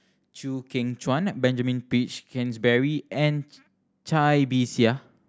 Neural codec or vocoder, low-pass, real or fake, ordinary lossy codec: none; none; real; none